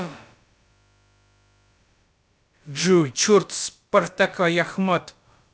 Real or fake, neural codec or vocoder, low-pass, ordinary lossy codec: fake; codec, 16 kHz, about 1 kbps, DyCAST, with the encoder's durations; none; none